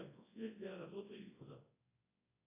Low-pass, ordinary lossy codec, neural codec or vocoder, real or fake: 3.6 kHz; AAC, 16 kbps; codec, 24 kHz, 0.9 kbps, WavTokenizer, large speech release; fake